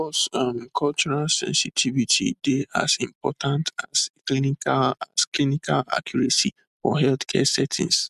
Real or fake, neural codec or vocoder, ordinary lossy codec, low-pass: real; none; MP3, 96 kbps; 14.4 kHz